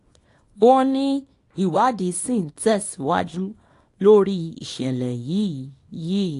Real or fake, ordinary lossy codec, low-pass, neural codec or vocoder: fake; AAC, 48 kbps; 10.8 kHz; codec, 24 kHz, 0.9 kbps, WavTokenizer, small release